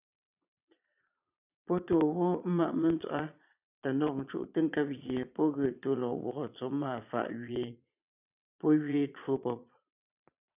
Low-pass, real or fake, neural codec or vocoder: 3.6 kHz; fake; vocoder, 22.05 kHz, 80 mel bands, Vocos